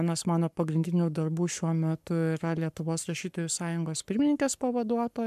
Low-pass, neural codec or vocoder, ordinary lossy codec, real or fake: 14.4 kHz; codec, 44.1 kHz, 7.8 kbps, Pupu-Codec; MP3, 96 kbps; fake